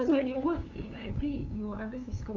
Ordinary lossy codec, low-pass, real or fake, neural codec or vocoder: none; 7.2 kHz; fake; codec, 16 kHz, 2 kbps, FunCodec, trained on LibriTTS, 25 frames a second